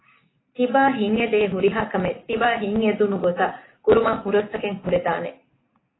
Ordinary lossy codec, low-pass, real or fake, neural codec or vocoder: AAC, 16 kbps; 7.2 kHz; real; none